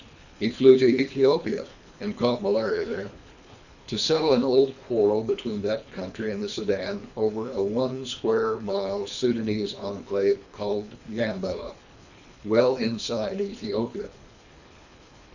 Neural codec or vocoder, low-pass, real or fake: codec, 24 kHz, 3 kbps, HILCodec; 7.2 kHz; fake